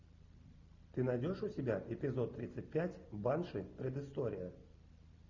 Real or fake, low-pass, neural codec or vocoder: real; 7.2 kHz; none